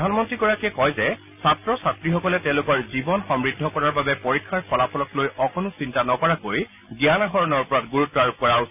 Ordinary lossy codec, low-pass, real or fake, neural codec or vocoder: none; 3.6 kHz; real; none